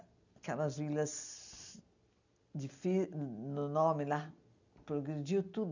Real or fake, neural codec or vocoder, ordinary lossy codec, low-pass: real; none; none; 7.2 kHz